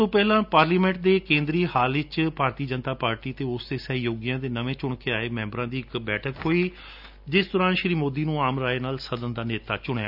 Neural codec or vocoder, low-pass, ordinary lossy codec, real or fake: none; 5.4 kHz; none; real